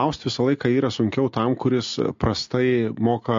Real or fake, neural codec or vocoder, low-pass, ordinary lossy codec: real; none; 7.2 kHz; MP3, 48 kbps